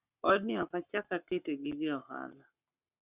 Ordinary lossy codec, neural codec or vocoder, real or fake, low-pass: Opus, 64 kbps; codec, 44.1 kHz, 7.8 kbps, Pupu-Codec; fake; 3.6 kHz